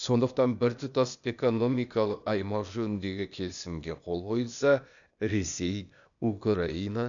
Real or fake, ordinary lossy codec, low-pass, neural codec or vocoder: fake; none; 7.2 kHz; codec, 16 kHz, 0.8 kbps, ZipCodec